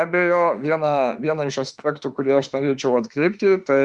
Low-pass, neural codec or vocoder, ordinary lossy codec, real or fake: 10.8 kHz; autoencoder, 48 kHz, 32 numbers a frame, DAC-VAE, trained on Japanese speech; Opus, 64 kbps; fake